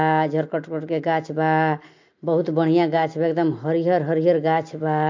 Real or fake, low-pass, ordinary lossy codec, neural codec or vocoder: real; 7.2 kHz; MP3, 48 kbps; none